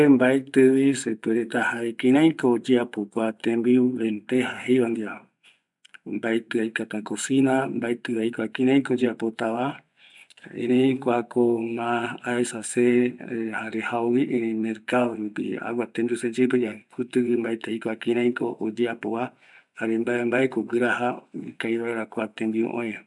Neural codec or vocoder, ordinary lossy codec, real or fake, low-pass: vocoder, 24 kHz, 100 mel bands, Vocos; none; fake; 10.8 kHz